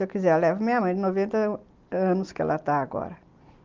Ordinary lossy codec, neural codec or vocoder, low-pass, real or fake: Opus, 24 kbps; none; 7.2 kHz; real